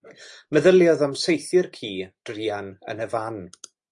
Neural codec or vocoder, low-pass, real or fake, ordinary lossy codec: none; 10.8 kHz; real; AAC, 64 kbps